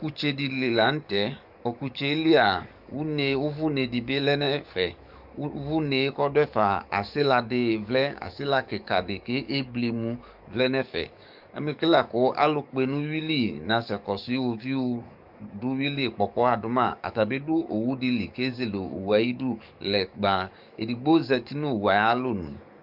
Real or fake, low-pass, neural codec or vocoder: fake; 5.4 kHz; codec, 44.1 kHz, 7.8 kbps, DAC